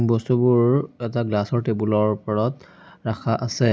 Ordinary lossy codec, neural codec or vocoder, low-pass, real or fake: none; none; none; real